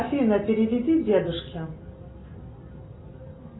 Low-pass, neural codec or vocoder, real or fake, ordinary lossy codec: 7.2 kHz; none; real; AAC, 16 kbps